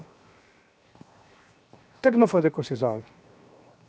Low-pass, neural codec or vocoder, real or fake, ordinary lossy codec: none; codec, 16 kHz, 0.7 kbps, FocalCodec; fake; none